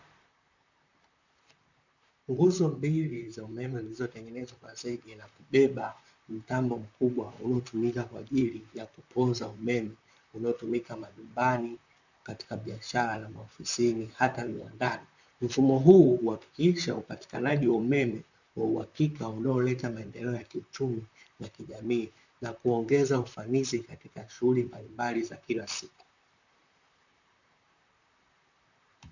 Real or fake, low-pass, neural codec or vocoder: fake; 7.2 kHz; vocoder, 44.1 kHz, 128 mel bands, Pupu-Vocoder